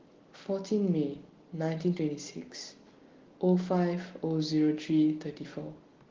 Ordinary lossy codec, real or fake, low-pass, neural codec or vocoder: Opus, 16 kbps; real; 7.2 kHz; none